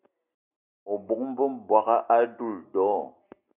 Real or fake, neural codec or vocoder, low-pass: real; none; 3.6 kHz